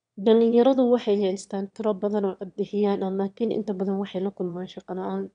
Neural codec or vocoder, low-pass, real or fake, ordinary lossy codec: autoencoder, 22.05 kHz, a latent of 192 numbers a frame, VITS, trained on one speaker; 9.9 kHz; fake; none